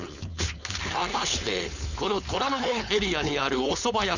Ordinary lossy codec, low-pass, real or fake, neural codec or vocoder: none; 7.2 kHz; fake; codec, 16 kHz, 4.8 kbps, FACodec